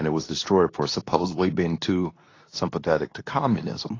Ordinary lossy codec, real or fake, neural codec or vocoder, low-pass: AAC, 32 kbps; fake; codec, 24 kHz, 0.9 kbps, WavTokenizer, medium speech release version 2; 7.2 kHz